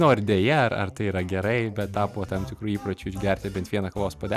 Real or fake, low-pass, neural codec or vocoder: real; 14.4 kHz; none